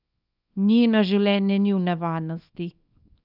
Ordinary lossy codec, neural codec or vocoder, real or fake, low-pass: none; codec, 24 kHz, 0.9 kbps, WavTokenizer, small release; fake; 5.4 kHz